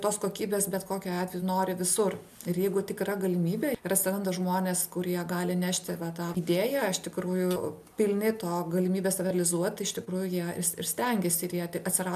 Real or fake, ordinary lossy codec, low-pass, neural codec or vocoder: real; MP3, 96 kbps; 14.4 kHz; none